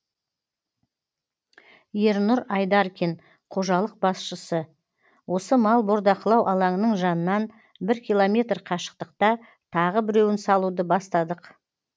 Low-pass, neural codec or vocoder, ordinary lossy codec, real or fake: none; none; none; real